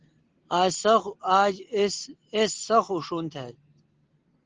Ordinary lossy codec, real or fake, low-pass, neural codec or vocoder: Opus, 16 kbps; real; 7.2 kHz; none